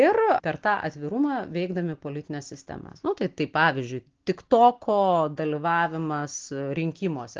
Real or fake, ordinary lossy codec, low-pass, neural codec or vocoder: real; Opus, 16 kbps; 7.2 kHz; none